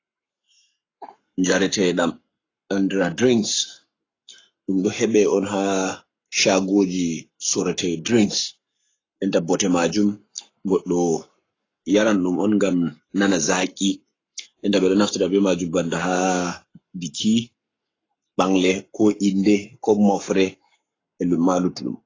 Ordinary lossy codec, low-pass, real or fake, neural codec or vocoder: AAC, 32 kbps; 7.2 kHz; fake; codec, 44.1 kHz, 7.8 kbps, Pupu-Codec